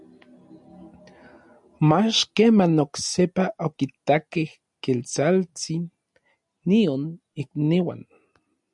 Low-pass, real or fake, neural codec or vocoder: 10.8 kHz; real; none